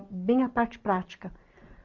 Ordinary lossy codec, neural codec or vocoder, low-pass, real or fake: Opus, 24 kbps; none; 7.2 kHz; real